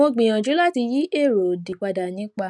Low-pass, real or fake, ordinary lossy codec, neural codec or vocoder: none; real; none; none